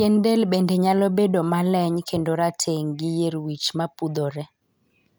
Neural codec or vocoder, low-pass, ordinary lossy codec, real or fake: none; none; none; real